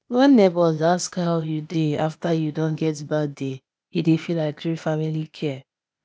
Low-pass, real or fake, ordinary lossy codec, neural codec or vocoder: none; fake; none; codec, 16 kHz, 0.8 kbps, ZipCodec